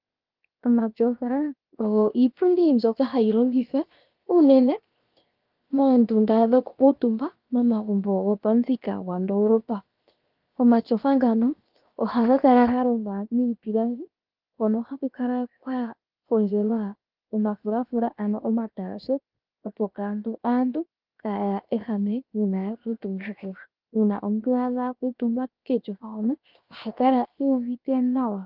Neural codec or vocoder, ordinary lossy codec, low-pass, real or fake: codec, 16 kHz, 0.7 kbps, FocalCodec; Opus, 32 kbps; 5.4 kHz; fake